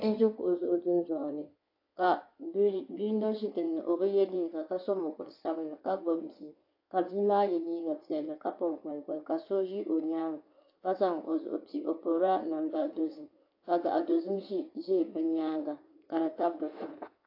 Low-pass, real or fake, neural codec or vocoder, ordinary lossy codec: 5.4 kHz; fake; codec, 16 kHz in and 24 kHz out, 2.2 kbps, FireRedTTS-2 codec; AAC, 32 kbps